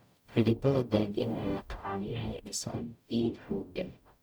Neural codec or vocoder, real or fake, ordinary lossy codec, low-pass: codec, 44.1 kHz, 0.9 kbps, DAC; fake; none; none